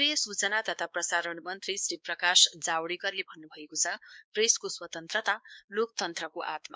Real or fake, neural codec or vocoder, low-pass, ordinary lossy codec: fake; codec, 16 kHz, 2 kbps, X-Codec, WavLM features, trained on Multilingual LibriSpeech; none; none